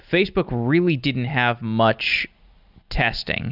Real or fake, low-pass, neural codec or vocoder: real; 5.4 kHz; none